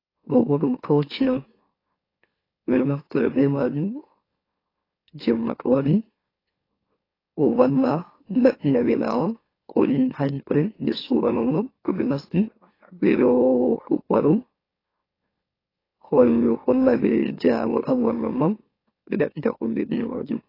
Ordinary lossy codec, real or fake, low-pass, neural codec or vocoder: AAC, 24 kbps; fake; 5.4 kHz; autoencoder, 44.1 kHz, a latent of 192 numbers a frame, MeloTTS